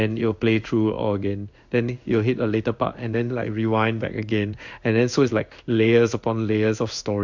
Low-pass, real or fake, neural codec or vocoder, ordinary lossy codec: 7.2 kHz; fake; codec, 16 kHz in and 24 kHz out, 1 kbps, XY-Tokenizer; none